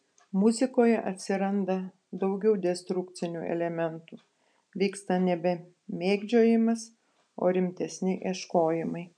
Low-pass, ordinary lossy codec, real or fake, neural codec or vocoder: 9.9 kHz; MP3, 96 kbps; real; none